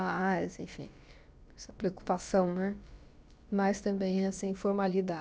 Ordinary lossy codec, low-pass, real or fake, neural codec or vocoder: none; none; fake; codec, 16 kHz, about 1 kbps, DyCAST, with the encoder's durations